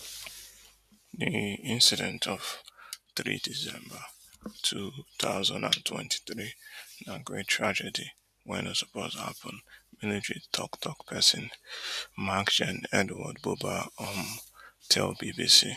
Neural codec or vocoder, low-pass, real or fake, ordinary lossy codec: none; 14.4 kHz; real; none